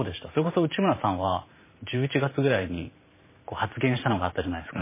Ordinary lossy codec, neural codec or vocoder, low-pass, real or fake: MP3, 16 kbps; vocoder, 44.1 kHz, 128 mel bands every 512 samples, BigVGAN v2; 3.6 kHz; fake